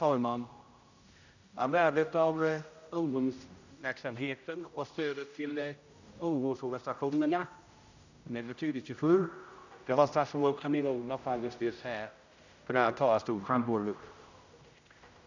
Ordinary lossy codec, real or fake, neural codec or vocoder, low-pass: none; fake; codec, 16 kHz, 0.5 kbps, X-Codec, HuBERT features, trained on general audio; 7.2 kHz